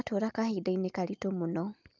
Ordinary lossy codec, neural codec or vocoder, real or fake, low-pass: Opus, 24 kbps; none; real; 7.2 kHz